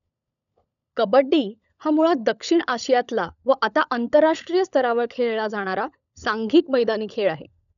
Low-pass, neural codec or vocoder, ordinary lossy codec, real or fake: 7.2 kHz; codec, 16 kHz, 16 kbps, FunCodec, trained on LibriTTS, 50 frames a second; none; fake